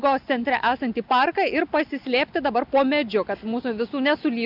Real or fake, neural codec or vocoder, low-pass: real; none; 5.4 kHz